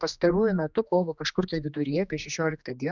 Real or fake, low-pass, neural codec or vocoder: fake; 7.2 kHz; codec, 16 kHz, 2 kbps, X-Codec, HuBERT features, trained on general audio